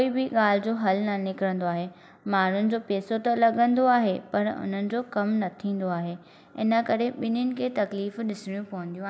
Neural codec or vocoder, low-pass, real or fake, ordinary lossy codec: none; none; real; none